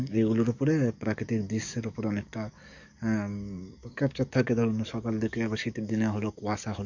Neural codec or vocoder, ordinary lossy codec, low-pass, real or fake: codec, 44.1 kHz, 7.8 kbps, DAC; none; 7.2 kHz; fake